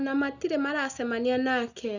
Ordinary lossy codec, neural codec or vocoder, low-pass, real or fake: none; none; 7.2 kHz; real